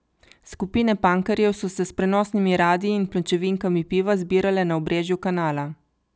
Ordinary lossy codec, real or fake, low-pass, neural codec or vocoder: none; real; none; none